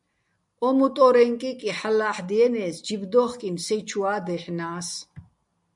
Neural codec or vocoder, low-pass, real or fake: none; 10.8 kHz; real